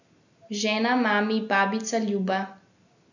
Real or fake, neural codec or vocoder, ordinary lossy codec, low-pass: real; none; none; 7.2 kHz